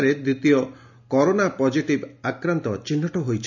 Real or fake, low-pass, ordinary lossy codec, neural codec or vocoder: real; 7.2 kHz; none; none